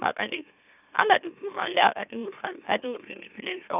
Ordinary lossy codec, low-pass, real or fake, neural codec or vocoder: none; 3.6 kHz; fake; autoencoder, 44.1 kHz, a latent of 192 numbers a frame, MeloTTS